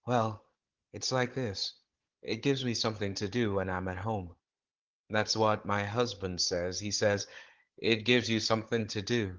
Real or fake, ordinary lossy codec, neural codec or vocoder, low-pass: fake; Opus, 16 kbps; codec, 16 kHz, 16 kbps, FunCodec, trained on Chinese and English, 50 frames a second; 7.2 kHz